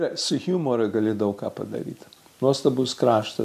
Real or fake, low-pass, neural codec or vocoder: real; 14.4 kHz; none